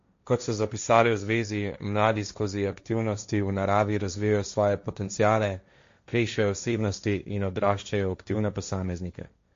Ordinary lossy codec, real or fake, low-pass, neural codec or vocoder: MP3, 48 kbps; fake; 7.2 kHz; codec, 16 kHz, 1.1 kbps, Voila-Tokenizer